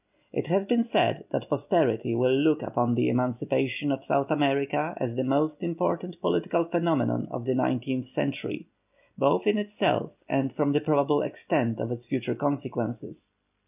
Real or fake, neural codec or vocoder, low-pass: real; none; 3.6 kHz